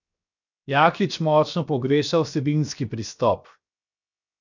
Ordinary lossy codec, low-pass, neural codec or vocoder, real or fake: none; 7.2 kHz; codec, 16 kHz, 0.7 kbps, FocalCodec; fake